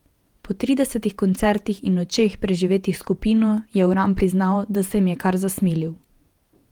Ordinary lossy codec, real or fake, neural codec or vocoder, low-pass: Opus, 24 kbps; fake; vocoder, 48 kHz, 128 mel bands, Vocos; 19.8 kHz